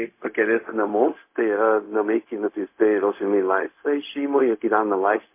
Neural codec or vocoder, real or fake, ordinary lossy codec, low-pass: codec, 16 kHz, 0.4 kbps, LongCat-Audio-Codec; fake; MP3, 24 kbps; 3.6 kHz